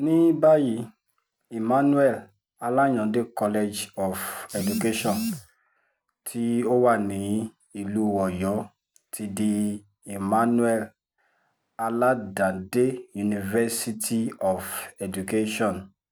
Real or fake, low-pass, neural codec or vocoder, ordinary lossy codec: real; none; none; none